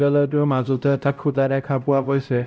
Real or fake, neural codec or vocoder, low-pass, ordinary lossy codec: fake; codec, 16 kHz, 0.5 kbps, X-Codec, HuBERT features, trained on LibriSpeech; none; none